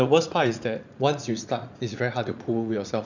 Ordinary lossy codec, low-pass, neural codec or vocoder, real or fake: none; 7.2 kHz; vocoder, 44.1 kHz, 80 mel bands, Vocos; fake